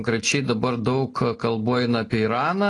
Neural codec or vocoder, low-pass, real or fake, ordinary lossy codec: none; 10.8 kHz; real; AAC, 32 kbps